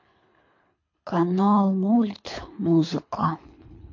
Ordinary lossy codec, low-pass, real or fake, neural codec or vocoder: MP3, 48 kbps; 7.2 kHz; fake; codec, 24 kHz, 3 kbps, HILCodec